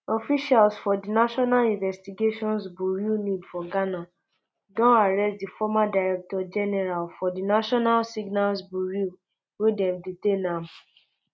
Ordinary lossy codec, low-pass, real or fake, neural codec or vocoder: none; none; real; none